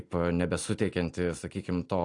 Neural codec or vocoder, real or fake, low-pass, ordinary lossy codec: none; real; 10.8 kHz; AAC, 48 kbps